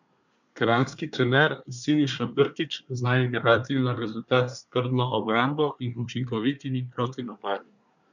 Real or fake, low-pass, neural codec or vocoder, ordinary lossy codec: fake; 7.2 kHz; codec, 24 kHz, 1 kbps, SNAC; none